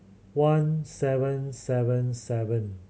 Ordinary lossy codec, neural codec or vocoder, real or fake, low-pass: none; none; real; none